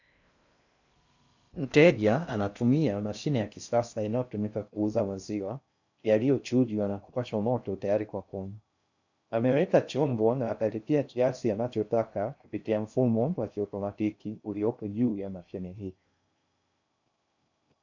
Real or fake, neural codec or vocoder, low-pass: fake; codec, 16 kHz in and 24 kHz out, 0.6 kbps, FocalCodec, streaming, 2048 codes; 7.2 kHz